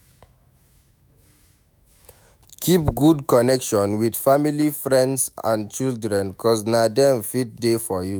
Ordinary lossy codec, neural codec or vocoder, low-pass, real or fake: none; autoencoder, 48 kHz, 128 numbers a frame, DAC-VAE, trained on Japanese speech; none; fake